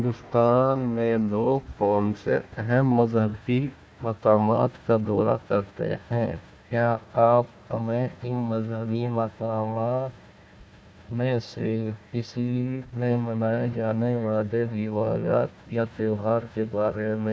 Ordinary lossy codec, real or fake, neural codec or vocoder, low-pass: none; fake; codec, 16 kHz, 1 kbps, FunCodec, trained on Chinese and English, 50 frames a second; none